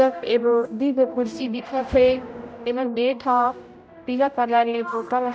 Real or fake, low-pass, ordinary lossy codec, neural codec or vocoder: fake; none; none; codec, 16 kHz, 0.5 kbps, X-Codec, HuBERT features, trained on general audio